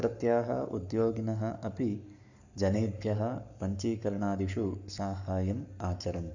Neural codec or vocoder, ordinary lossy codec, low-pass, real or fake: codec, 44.1 kHz, 7.8 kbps, Pupu-Codec; none; 7.2 kHz; fake